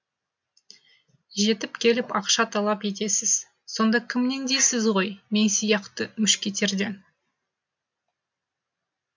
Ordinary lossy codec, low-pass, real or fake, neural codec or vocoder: MP3, 64 kbps; 7.2 kHz; real; none